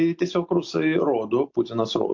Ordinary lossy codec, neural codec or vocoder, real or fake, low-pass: MP3, 48 kbps; none; real; 7.2 kHz